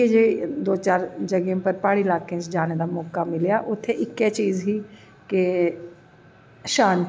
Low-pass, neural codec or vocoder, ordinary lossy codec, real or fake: none; none; none; real